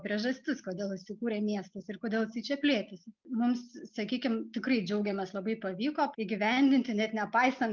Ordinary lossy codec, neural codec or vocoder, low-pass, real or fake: Opus, 24 kbps; none; 7.2 kHz; real